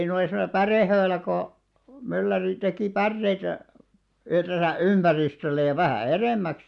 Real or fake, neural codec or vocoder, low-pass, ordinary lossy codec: real; none; 10.8 kHz; none